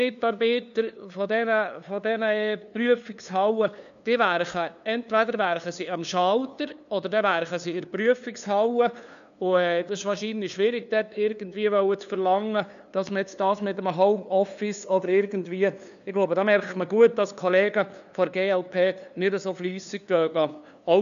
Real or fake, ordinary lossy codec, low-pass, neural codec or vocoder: fake; none; 7.2 kHz; codec, 16 kHz, 2 kbps, FunCodec, trained on LibriTTS, 25 frames a second